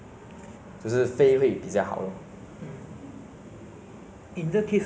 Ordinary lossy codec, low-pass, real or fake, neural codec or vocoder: none; none; real; none